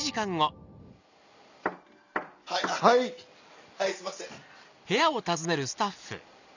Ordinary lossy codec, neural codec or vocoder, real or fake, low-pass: none; none; real; 7.2 kHz